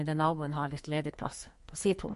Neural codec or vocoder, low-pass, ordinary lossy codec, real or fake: codec, 44.1 kHz, 2.6 kbps, SNAC; 14.4 kHz; MP3, 48 kbps; fake